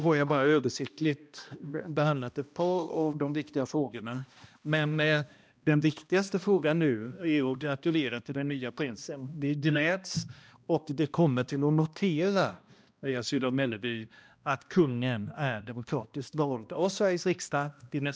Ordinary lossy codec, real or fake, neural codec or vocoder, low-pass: none; fake; codec, 16 kHz, 1 kbps, X-Codec, HuBERT features, trained on balanced general audio; none